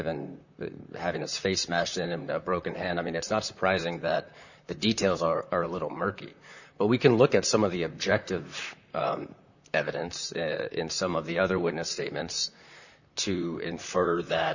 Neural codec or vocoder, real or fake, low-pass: vocoder, 44.1 kHz, 128 mel bands, Pupu-Vocoder; fake; 7.2 kHz